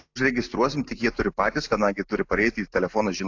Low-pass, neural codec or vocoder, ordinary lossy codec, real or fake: 7.2 kHz; none; AAC, 48 kbps; real